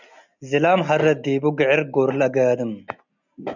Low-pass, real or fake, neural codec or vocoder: 7.2 kHz; real; none